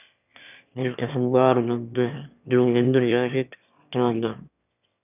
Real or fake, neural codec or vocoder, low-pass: fake; autoencoder, 22.05 kHz, a latent of 192 numbers a frame, VITS, trained on one speaker; 3.6 kHz